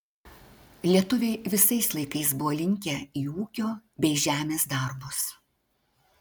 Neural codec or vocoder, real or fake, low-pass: none; real; 19.8 kHz